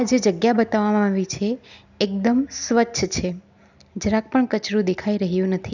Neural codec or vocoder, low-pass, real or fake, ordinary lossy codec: none; 7.2 kHz; real; none